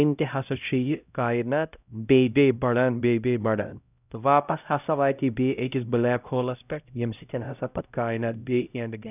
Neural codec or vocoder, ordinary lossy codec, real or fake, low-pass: codec, 16 kHz, 0.5 kbps, X-Codec, HuBERT features, trained on LibriSpeech; none; fake; 3.6 kHz